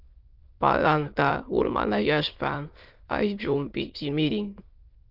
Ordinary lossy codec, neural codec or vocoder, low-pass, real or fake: Opus, 32 kbps; autoencoder, 22.05 kHz, a latent of 192 numbers a frame, VITS, trained on many speakers; 5.4 kHz; fake